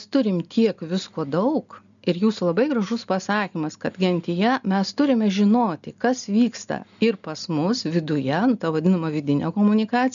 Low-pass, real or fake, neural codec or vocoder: 7.2 kHz; real; none